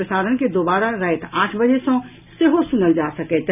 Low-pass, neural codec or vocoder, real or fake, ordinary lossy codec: 3.6 kHz; none; real; none